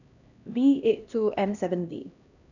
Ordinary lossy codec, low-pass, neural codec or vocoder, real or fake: none; 7.2 kHz; codec, 16 kHz, 1 kbps, X-Codec, HuBERT features, trained on LibriSpeech; fake